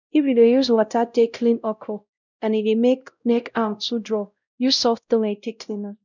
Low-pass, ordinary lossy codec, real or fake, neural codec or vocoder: 7.2 kHz; none; fake; codec, 16 kHz, 0.5 kbps, X-Codec, WavLM features, trained on Multilingual LibriSpeech